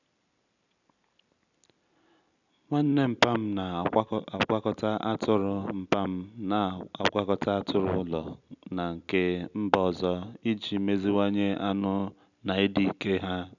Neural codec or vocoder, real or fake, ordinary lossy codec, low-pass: none; real; none; 7.2 kHz